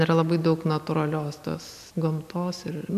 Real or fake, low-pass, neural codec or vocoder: real; 14.4 kHz; none